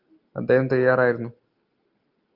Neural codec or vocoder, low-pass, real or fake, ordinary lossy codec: none; 5.4 kHz; real; Opus, 24 kbps